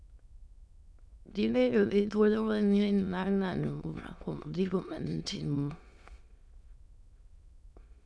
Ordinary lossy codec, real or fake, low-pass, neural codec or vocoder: none; fake; none; autoencoder, 22.05 kHz, a latent of 192 numbers a frame, VITS, trained on many speakers